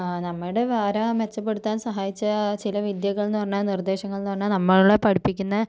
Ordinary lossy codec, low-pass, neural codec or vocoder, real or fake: none; none; none; real